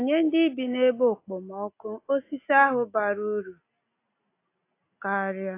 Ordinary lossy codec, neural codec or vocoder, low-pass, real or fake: AAC, 24 kbps; none; 3.6 kHz; real